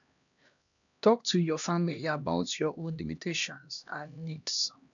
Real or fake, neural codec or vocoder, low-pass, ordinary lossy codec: fake; codec, 16 kHz, 1 kbps, X-Codec, HuBERT features, trained on LibriSpeech; 7.2 kHz; none